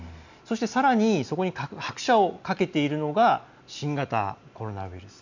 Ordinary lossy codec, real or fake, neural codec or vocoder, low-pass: none; real; none; 7.2 kHz